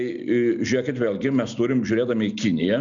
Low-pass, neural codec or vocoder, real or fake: 7.2 kHz; none; real